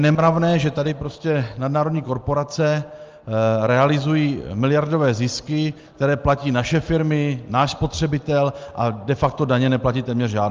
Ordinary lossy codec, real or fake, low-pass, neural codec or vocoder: Opus, 24 kbps; real; 7.2 kHz; none